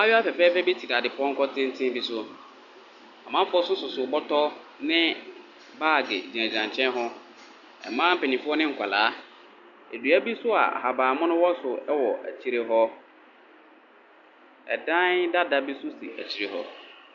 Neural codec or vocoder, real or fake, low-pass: none; real; 7.2 kHz